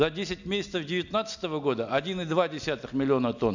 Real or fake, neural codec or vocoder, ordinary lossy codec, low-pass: real; none; none; 7.2 kHz